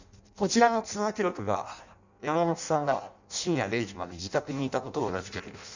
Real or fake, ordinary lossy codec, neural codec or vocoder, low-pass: fake; none; codec, 16 kHz in and 24 kHz out, 0.6 kbps, FireRedTTS-2 codec; 7.2 kHz